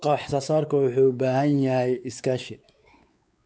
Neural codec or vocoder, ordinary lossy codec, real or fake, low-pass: codec, 16 kHz, 4 kbps, X-Codec, WavLM features, trained on Multilingual LibriSpeech; none; fake; none